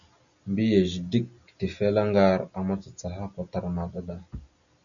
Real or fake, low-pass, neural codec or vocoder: real; 7.2 kHz; none